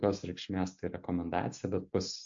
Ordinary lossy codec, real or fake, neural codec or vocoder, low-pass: MP3, 48 kbps; real; none; 7.2 kHz